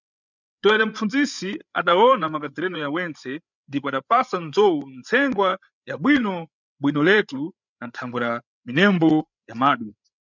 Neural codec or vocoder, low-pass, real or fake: codec, 16 kHz, 8 kbps, FreqCodec, larger model; 7.2 kHz; fake